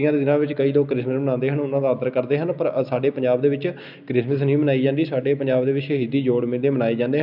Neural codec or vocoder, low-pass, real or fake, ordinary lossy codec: none; 5.4 kHz; real; none